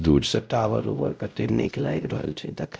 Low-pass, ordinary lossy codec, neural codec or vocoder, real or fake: none; none; codec, 16 kHz, 0.5 kbps, X-Codec, WavLM features, trained on Multilingual LibriSpeech; fake